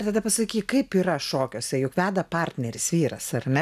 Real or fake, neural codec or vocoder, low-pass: real; none; 14.4 kHz